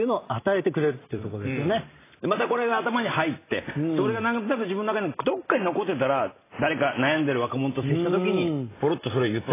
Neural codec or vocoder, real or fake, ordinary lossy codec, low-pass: none; real; AAC, 16 kbps; 3.6 kHz